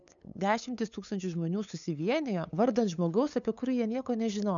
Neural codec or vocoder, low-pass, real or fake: codec, 16 kHz, 8 kbps, FunCodec, trained on LibriTTS, 25 frames a second; 7.2 kHz; fake